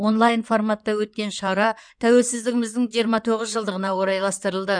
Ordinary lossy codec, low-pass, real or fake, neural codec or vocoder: none; 9.9 kHz; fake; codec, 16 kHz in and 24 kHz out, 2.2 kbps, FireRedTTS-2 codec